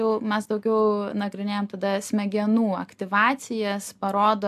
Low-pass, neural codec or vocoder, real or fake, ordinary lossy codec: 14.4 kHz; none; real; AAC, 96 kbps